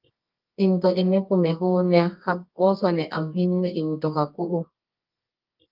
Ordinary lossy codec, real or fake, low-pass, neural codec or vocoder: Opus, 24 kbps; fake; 5.4 kHz; codec, 24 kHz, 0.9 kbps, WavTokenizer, medium music audio release